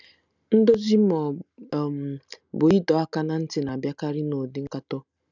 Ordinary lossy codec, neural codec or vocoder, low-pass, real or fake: none; none; 7.2 kHz; real